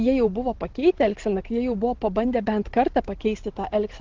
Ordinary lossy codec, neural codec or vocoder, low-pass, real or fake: Opus, 16 kbps; none; 7.2 kHz; real